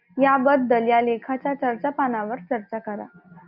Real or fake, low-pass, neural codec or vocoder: real; 5.4 kHz; none